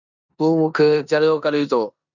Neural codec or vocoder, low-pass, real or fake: codec, 16 kHz in and 24 kHz out, 0.9 kbps, LongCat-Audio-Codec, four codebook decoder; 7.2 kHz; fake